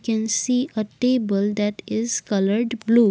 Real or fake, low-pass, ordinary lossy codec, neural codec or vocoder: real; none; none; none